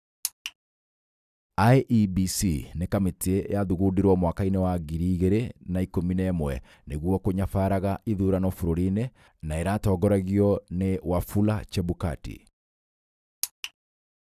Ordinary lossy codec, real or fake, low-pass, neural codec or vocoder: none; real; 14.4 kHz; none